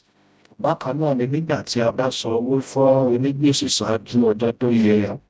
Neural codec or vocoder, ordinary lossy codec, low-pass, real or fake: codec, 16 kHz, 0.5 kbps, FreqCodec, smaller model; none; none; fake